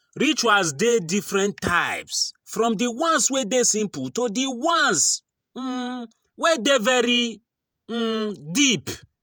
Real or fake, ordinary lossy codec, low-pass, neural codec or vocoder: fake; none; none; vocoder, 48 kHz, 128 mel bands, Vocos